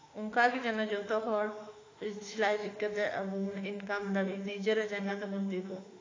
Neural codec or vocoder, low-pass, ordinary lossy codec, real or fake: autoencoder, 48 kHz, 32 numbers a frame, DAC-VAE, trained on Japanese speech; 7.2 kHz; AAC, 32 kbps; fake